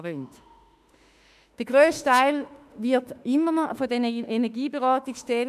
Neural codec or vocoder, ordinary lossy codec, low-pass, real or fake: autoencoder, 48 kHz, 32 numbers a frame, DAC-VAE, trained on Japanese speech; none; 14.4 kHz; fake